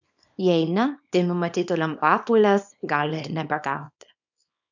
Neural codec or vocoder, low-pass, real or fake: codec, 24 kHz, 0.9 kbps, WavTokenizer, small release; 7.2 kHz; fake